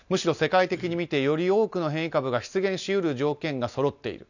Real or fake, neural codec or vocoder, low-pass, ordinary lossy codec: real; none; 7.2 kHz; none